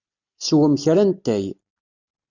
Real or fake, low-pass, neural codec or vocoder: real; 7.2 kHz; none